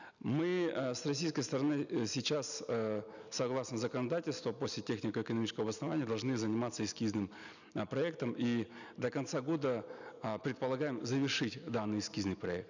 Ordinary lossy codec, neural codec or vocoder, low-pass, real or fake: none; none; 7.2 kHz; real